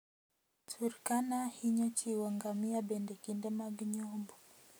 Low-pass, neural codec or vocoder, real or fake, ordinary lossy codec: none; none; real; none